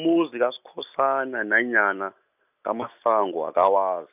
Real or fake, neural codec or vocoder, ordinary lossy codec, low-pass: real; none; none; 3.6 kHz